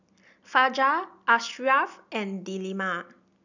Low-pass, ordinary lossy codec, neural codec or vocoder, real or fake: 7.2 kHz; none; none; real